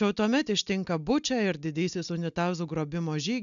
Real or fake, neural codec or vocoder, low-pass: real; none; 7.2 kHz